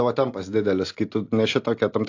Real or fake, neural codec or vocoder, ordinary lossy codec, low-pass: real; none; AAC, 48 kbps; 7.2 kHz